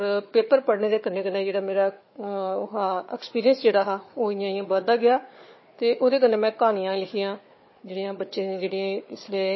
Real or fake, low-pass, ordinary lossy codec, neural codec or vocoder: fake; 7.2 kHz; MP3, 24 kbps; codec, 16 kHz, 4 kbps, FunCodec, trained on Chinese and English, 50 frames a second